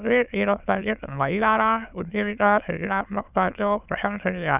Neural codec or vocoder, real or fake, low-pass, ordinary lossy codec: autoencoder, 22.05 kHz, a latent of 192 numbers a frame, VITS, trained on many speakers; fake; 3.6 kHz; none